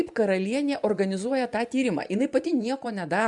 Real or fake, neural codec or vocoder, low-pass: fake; vocoder, 24 kHz, 100 mel bands, Vocos; 10.8 kHz